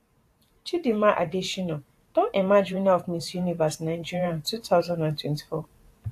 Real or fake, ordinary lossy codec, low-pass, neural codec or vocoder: fake; AAC, 64 kbps; 14.4 kHz; vocoder, 44.1 kHz, 128 mel bands every 512 samples, BigVGAN v2